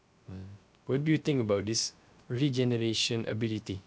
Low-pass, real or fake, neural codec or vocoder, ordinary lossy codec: none; fake; codec, 16 kHz, 0.3 kbps, FocalCodec; none